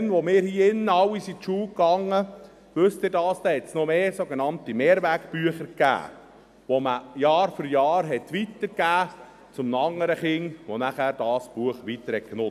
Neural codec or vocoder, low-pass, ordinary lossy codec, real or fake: none; 14.4 kHz; none; real